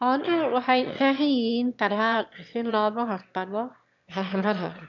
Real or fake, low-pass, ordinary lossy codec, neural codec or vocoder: fake; 7.2 kHz; none; autoencoder, 22.05 kHz, a latent of 192 numbers a frame, VITS, trained on one speaker